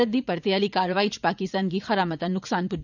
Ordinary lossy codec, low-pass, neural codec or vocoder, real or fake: none; 7.2 kHz; vocoder, 44.1 kHz, 80 mel bands, Vocos; fake